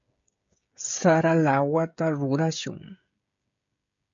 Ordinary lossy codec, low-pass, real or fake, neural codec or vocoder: MP3, 64 kbps; 7.2 kHz; fake; codec, 16 kHz, 16 kbps, FreqCodec, smaller model